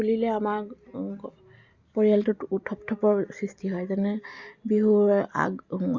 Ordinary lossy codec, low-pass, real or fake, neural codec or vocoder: Opus, 64 kbps; 7.2 kHz; real; none